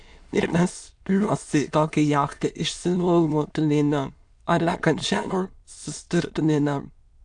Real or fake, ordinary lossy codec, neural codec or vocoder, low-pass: fake; AAC, 64 kbps; autoencoder, 22.05 kHz, a latent of 192 numbers a frame, VITS, trained on many speakers; 9.9 kHz